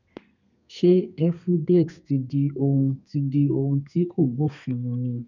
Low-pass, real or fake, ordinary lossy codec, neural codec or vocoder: 7.2 kHz; fake; none; codec, 32 kHz, 1.9 kbps, SNAC